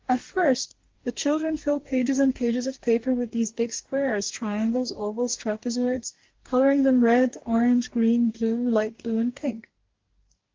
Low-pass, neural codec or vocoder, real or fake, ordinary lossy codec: 7.2 kHz; codec, 44.1 kHz, 2.6 kbps, DAC; fake; Opus, 16 kbps